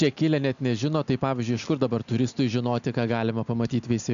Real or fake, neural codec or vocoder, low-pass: real; none; 7.2 kHz